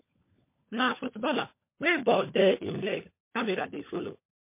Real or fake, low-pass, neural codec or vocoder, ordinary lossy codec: fake; 3.6 kHz; codec, 16 kHz, 16 kbps, FunCodec, trained on LibriTTS, 50 frames a second; MP3, 24 kbps